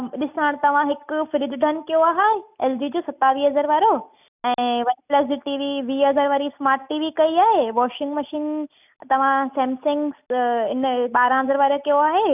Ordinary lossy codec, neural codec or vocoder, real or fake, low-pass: none; none; real; 3.6 kHz